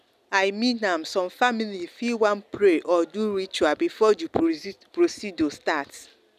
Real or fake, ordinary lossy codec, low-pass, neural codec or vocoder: real; none; 14.4 kHz; none